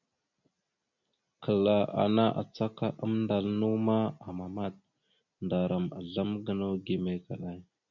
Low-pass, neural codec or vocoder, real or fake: 7.2 kHz; none; real